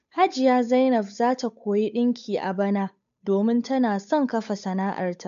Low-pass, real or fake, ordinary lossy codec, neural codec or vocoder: 7.2 kHz; fake; MP3, 64 kbps; codec, 16 kHz, 8 kbps, FunCodec, trained on Chinese and English, 25 frames a second